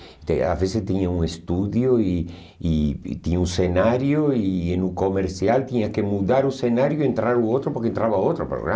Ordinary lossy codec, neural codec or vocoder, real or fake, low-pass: none; none; real; none